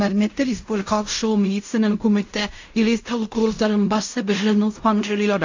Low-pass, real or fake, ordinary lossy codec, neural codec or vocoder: 7.2 kHz; fake; AAC, 48 kbps; codec, 16 kHz in and 24 kHz out, 0.4 kbps, LongCat-Audio-Codec, fine tuned four codebook decoder